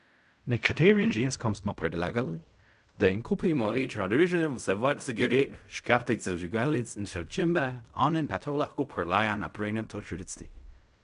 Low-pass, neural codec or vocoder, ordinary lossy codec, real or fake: 10.8 kHz; codec, 16 kHz in and 24 kHz out, 0.4 kbps, LongCat-Audio-Codec, fine tuned four codebook decoder; none; fake